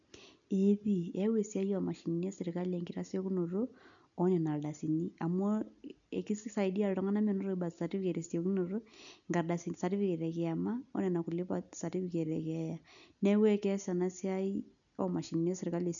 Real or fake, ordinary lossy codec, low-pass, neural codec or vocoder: real; none; 7.2 kHz; none